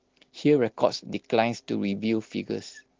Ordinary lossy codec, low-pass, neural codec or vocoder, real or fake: Opus, 16 kbps; 7.2 kHz; none; real